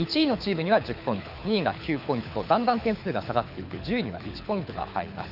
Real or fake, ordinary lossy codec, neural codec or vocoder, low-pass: fake; none; codec, 24 kHz, 6 kbps, HILCodec; 5.4 kHz